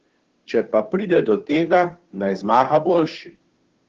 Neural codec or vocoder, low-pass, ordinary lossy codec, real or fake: codec, 16 kHz, 2 kbps, FunCodec, trained on Chinese and English, 25 frames a second; 7.2 kHz; Opus, 16 kbps; fake